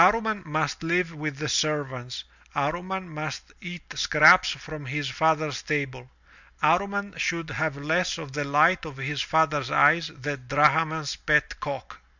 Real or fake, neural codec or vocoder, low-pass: real; none; 7.2 kHz